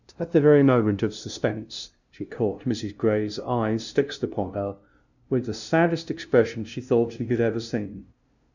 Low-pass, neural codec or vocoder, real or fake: 7.2 kHz; codec, 16 kHz, 0.5 kbps, FunCodec, trained on LibriTTS, 25 frames a second; fake